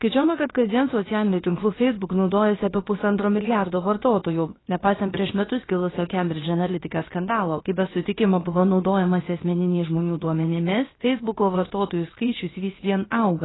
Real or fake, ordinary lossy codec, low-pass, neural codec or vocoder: fake; AAC, 16 kbps; 7.2 kHz; codec, 16 kHz, about 1 kbps, DyCAST, with the encoder's durations